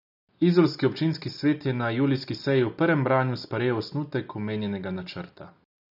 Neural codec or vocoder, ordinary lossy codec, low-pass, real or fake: none; none; 5.4 kHz; real